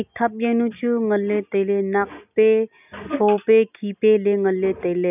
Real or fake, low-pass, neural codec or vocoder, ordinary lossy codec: real; 3.6 kHz; none; none